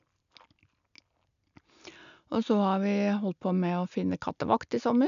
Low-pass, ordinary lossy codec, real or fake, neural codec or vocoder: 7.2 kHz; none; real; none